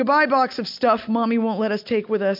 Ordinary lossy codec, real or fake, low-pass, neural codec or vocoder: MP3, 48 kbps; real; 5.4 kHz; none